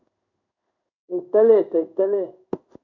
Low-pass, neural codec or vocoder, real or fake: 7.2 kHz; codec, 16 kHz in and 24 kHz out, 1 kbps, XY-Tokenizer; fake